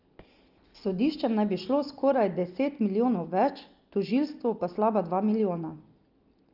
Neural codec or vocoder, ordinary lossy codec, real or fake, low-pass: none; Opus, 24 kbps; real; 5.4 kHz